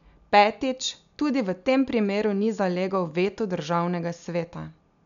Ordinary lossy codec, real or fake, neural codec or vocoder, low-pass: none; real; none; 7.2 kHz